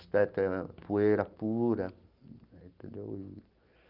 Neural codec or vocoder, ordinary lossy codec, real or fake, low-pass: none; Opus, 32 kbps; real; 5.4 kHz